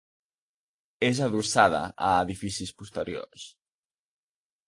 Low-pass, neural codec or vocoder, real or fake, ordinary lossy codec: 10.8 kHz; none; real; AAC, 48 kbps